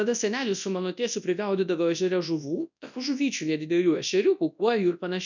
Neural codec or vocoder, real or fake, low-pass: codec, 24 kHz, 0.9 kbps, WavTokenizer, large speech release; fake; 7.2 kHz